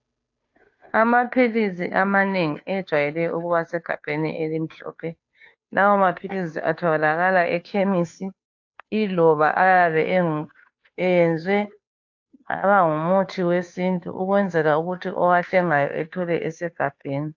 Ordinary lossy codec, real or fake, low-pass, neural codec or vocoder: AAC, 48 kbps; fake; 7.2 kHz; codec, 16 kHz, 2 kbps, FunCodec, trained on Chinese and English, 25 frames a second